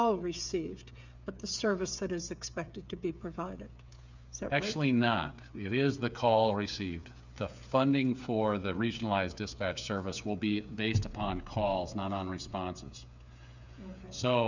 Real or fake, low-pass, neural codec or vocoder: fake; 7.2 kHz; codec, 16 kHz, 8 kbps, FreqCodec, smaller model